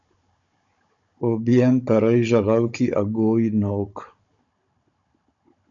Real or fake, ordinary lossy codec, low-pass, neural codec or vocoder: fake; MP3, 64 kbps; 7.2 kHz; codec, 16 kHz, 16 kbps, FunCodec, trained on Chinese and English, 50 frames a second